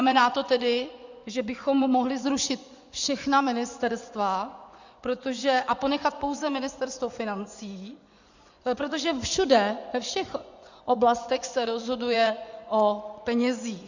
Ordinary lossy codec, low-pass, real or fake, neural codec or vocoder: Opus, 64 kbps; 7.2 kHz; fake; vocoder, 24 kHz, 100 mel bands, Vocos